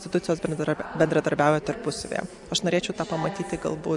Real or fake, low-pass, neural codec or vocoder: real; 10.8 kHz; none